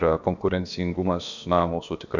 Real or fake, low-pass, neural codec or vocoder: fake; 7.2 kHz; codec, 16 kHz, about 1 kbps, DyCAST, with the encoder's durations